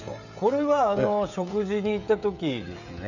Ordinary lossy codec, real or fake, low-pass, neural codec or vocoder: none; fake; 7.2 kHz; codec, 16 kHz, 16 kbps, FreqCodec, smaller model